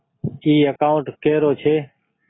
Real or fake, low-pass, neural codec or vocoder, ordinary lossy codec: real; 7.2 kHz; none; AAC, 16 kbps